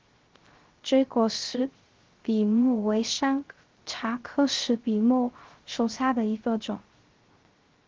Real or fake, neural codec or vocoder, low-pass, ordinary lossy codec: fake; codec, 16 kHz, 0.3 kbps, FocalCodec; 7.2 kHz; Opus, 16 kbps